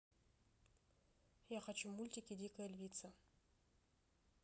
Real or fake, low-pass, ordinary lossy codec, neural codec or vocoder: real; none; none; none